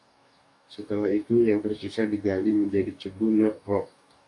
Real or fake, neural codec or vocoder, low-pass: fake; codec, 44.1 kHz, 2.6 kbps, DAC; 10.8 kHz